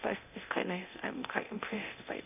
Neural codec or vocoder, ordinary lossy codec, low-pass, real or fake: codec, 24 kHz, 0.9 kbps, DualCodec; none; 3.6 kHz; fake